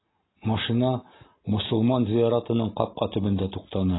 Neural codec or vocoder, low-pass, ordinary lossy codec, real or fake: none; 7.2 kHz; AAC, 16 kbps; real